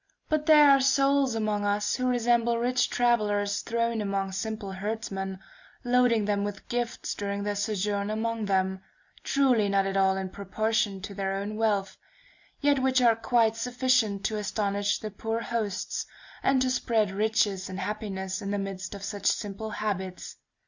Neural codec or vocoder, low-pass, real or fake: none; 7.2 kHz; real